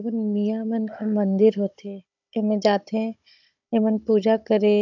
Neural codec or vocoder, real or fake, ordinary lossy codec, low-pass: codec, 16 kHz, 16 kbps, FunCodec, trained on Chinese and English, 50 frames a second; fake; none; 7.2 kHz